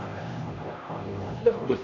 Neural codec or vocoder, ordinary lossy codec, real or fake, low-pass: codec, 16 kHz, 1 kbps, X-Codec, HuBERT features, trained on LibriSpeech; none; fake; 7.2 kHz